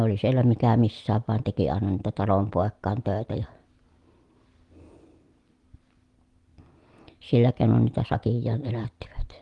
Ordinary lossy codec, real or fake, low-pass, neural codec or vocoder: Opus, 24 kbps; real; 10.8 kHz; none